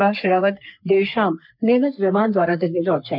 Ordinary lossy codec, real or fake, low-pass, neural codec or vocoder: none; fake; 5.4 kHz; codec, 44.1 kHz, 3.4 kbps, Pupu-Codec